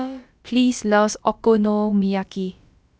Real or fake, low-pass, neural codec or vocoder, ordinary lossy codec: fake; none; codec, 16 kHz, about 1 kbps, DyCAST, with the encoder's durations; none